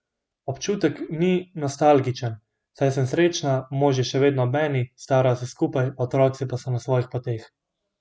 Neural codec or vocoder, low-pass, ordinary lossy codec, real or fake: none; none; none; real